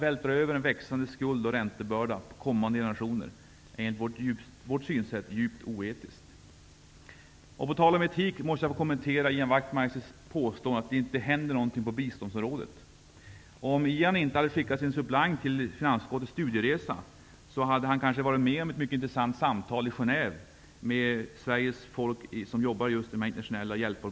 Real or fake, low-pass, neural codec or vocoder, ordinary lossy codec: real; none; none; none